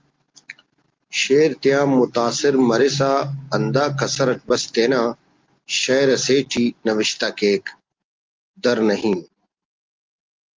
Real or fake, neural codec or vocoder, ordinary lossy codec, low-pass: real; none; Opus, 32 kbps; 7.2 kHz